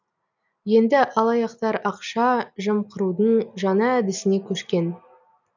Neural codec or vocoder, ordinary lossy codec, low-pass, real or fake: none; none; 7.2 kHz; real